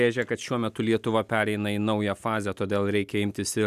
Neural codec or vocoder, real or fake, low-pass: none; real; 14.4 kHz